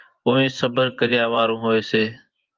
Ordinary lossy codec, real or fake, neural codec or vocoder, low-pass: Opus, 32 kbps; fake; vocoder, 24 kHz, 100 mel bands, Vocos; 7.2 kHz